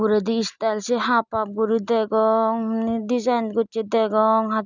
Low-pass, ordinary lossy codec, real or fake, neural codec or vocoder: 7.2 kHz; none; real; none